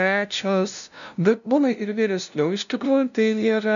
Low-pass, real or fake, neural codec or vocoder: 7.2 kHz; fake; codec, 16 kHz, 0.5 kbps, FunCodec, trained on LibriTTS, 25 frames a second